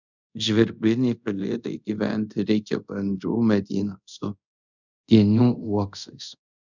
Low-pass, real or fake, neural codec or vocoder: 7.2 kHz; fake; codec, 24 kHz, 0.5 kbps, DualCodec